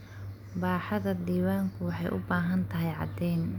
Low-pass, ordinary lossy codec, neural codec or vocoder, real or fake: 19.8 kHz; none; none; real